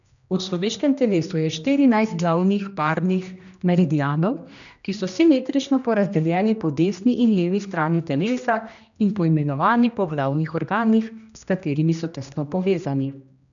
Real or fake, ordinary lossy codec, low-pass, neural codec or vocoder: fake; none; 7.2 kHz; codec, 16 kHz, 1 kbps, X-Codec, HuBERT features, trained on general audio